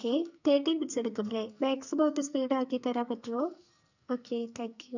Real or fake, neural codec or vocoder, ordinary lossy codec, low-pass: fake; codec, 44.1 kHz, 2.6 kbps, SNAC; none; 7.2 kHz